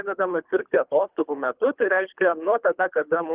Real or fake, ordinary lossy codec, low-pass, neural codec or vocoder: fake; Opus, 32 kbps; 3.6 kHz; codec, 24 kHz, 3 kbps, HILCodec